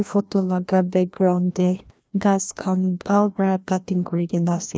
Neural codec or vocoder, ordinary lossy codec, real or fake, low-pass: codec, 16 kHz, 1 kbps, FreqCodec, larger model; none; fake; none